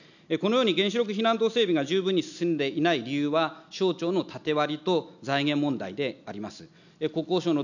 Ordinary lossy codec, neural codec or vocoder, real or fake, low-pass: none; none; real; 7.2 kHz